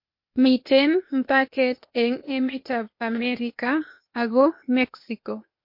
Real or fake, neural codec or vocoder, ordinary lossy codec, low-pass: fake; codec, 16 kHz, 0.8 kbps, ZipCodec; MP3, 32 kbps; 5.4 kHz